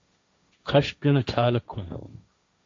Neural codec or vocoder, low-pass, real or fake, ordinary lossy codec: codec, 16 kHz, 1.1 kbps, Voila-Tokenizer; 7.2 kHz; fake; AAC, 64 kbps